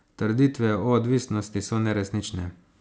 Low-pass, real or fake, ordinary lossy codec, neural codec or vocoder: none; real; none; none